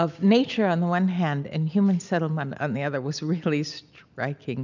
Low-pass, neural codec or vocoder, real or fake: 7.2 kHz; none; real